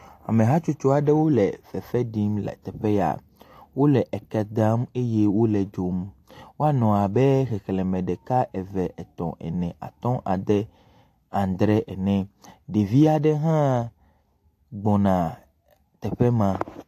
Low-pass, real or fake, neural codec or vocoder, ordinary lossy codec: 14.4 kHz; real; none; AAC, 64 kbps